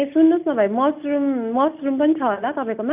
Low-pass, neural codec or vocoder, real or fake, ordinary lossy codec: 3.6 kHz; none; real; none